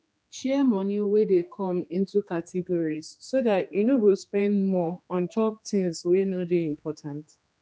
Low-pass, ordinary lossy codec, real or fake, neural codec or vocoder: none; none; fake; codec, 16 kHz, 2 kbps, X-Codec, HuBERT features, trained on general audio